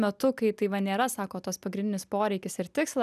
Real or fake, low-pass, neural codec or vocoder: real; 14.4 kHz; none